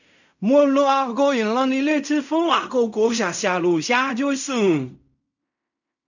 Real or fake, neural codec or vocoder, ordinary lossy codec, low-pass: fake; codec, 16 kHz in and 24 kHz out, 0.4 kbps, LongCat-Audio-Codec, fine tuned four codebook decoder; none; 7.2 kHz